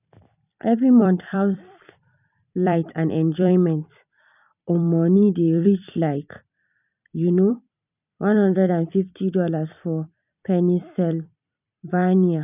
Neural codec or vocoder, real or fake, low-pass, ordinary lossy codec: vocoder, 44.1 kHz, 128 mel bands every 256 samples, BigVGAN v2; fake; 3.6 kHz; none